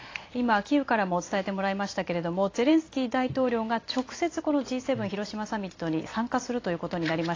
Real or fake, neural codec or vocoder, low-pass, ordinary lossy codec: fake; vocoder, 44.1 kHz, 128 mel bands every 256 samples, BigVGAN v2; 7.2 kHz; AAC, 32 kbps